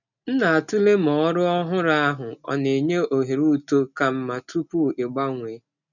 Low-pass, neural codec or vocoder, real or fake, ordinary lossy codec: 7.2 kHz; none; real; none